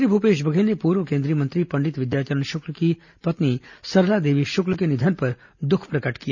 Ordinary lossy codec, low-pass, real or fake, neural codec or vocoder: none; 7.2 kHz; real; none